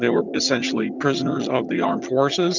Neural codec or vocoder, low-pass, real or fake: vocoder, 22.05 kHz, 80 mel bands, HiFi-GAN; 7.2 kHz; fake